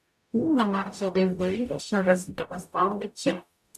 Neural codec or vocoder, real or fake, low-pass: codec, 44.1 kHz, 0.9 kbps, DAC; fake; 14.4 kHz